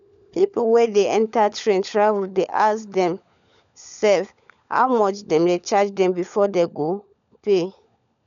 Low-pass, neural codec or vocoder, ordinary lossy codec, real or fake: 7.2 kHz; codec, 16 kHz, 16 kbps, FunCodec, trained on LibriTTS, 50 frames a second; none; fake